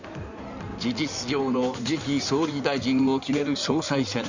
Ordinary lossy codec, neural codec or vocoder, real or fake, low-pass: Opus, 64 kbps; codec, 16 kHz in and 24 kHz out, 2.2 kbps, FireRedTTS-2 codec; fake; 7.2 kHz